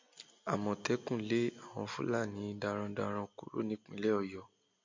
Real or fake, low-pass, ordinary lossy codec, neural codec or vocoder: real; 7.2 kHz; MP3, 48 kbps; none